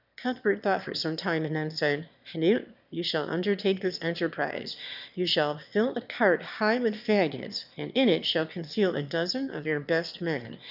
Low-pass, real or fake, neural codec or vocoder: 5.4 kHz; fake; autoencoder, 22.05 kHz, a latent of 192 numbers a frame, VITS, trained on one speaker